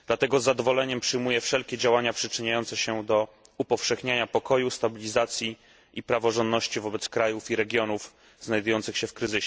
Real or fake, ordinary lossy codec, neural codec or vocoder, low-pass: real; none; none; none